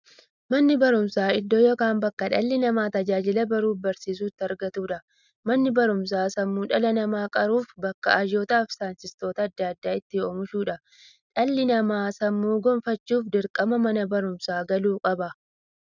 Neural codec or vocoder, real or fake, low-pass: none; real; 7.2 kHz